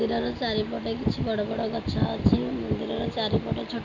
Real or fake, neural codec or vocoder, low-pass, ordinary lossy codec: real; none; 7.2 kHz; MP3, 64 kbps